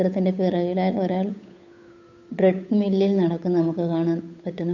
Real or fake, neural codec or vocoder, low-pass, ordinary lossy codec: fake; codec, 16 kHz, 8 kbps, FunCodec, trained on Chinese and English, 25 frames a second; 7.2 kHz; none